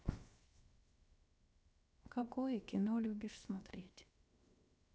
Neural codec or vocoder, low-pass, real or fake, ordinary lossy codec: codec, 16 kHz, 0.7 kbps, FocalCodec; none; fake; none